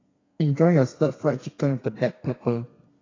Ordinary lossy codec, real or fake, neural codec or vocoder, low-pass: AAC, 32 kbps; fake; codec, 32 kHz, 1.9 kbps, SNAC; 7.2 kHz